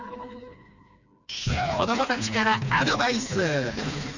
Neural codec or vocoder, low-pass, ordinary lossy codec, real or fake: codec, 16 kHz, 2 kbps, FreqCodec, smaller model; 7.2 kHz; none; fake